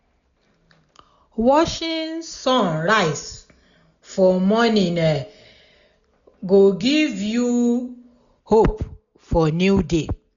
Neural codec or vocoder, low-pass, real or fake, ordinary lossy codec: none; 7.2 kHz; real; none